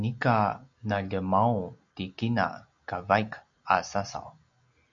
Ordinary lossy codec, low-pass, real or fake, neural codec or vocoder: MP3, 64 kbps; 7.2 kHz; real; none